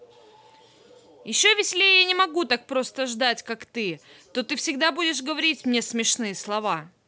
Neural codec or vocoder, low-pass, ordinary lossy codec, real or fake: none; none; none; real